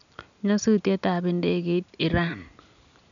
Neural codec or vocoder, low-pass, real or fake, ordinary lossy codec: none; 7.2 kHz; real; none